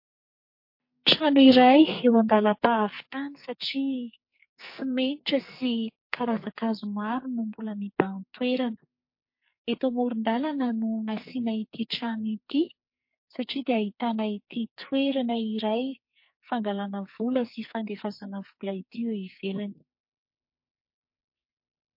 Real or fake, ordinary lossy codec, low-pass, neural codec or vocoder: fake; MP3, 32 kbps; 5.4 kHz; codec, 44.1 kHz, 2.6 kbps, SNAC